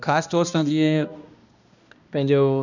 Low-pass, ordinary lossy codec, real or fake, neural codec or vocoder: 7.2 kHz; none; fake; codec, 16 kHz, 1 kbps, X-Codec, HuBERT features, trained on balanced general audio